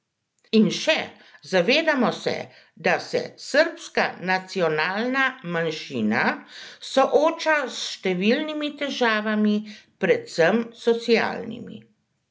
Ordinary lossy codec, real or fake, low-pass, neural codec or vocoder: none; real; none; none